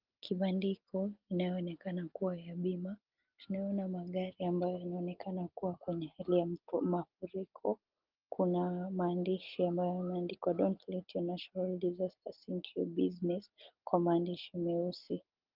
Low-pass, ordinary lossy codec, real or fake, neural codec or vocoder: 5.4 kHz; Opus, 32 kbps; real; none